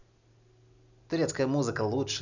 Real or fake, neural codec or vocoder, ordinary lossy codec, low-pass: real; none; none; 7.2 kHz